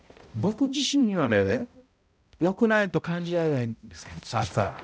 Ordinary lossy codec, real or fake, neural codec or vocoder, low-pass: none; fake; codec, 16 kHz, 0.5 kbps, X-Codec, HuBERT features, trained on balanced general audio; none